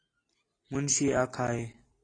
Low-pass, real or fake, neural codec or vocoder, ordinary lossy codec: 9.9 kHz; real; none; AAC, 32 kbps